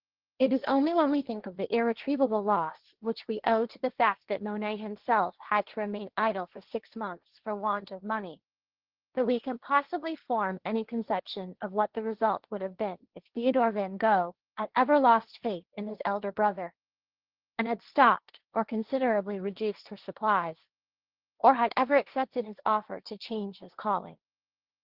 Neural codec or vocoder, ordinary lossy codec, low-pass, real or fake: codec, 16 kHz, 1.1 kbps, Voila-Tokenizer; Opus, 16 kbps; 5.4 kHz; fake